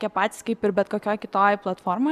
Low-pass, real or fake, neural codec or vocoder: 14.4 kHz; real; none